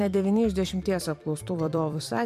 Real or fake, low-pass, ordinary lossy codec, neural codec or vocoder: fake; 14.4 kHz; MP3, 96 kbps; vocoder, 44.1 kHz, 128 mel bands every 256 samples, BigVGAN v2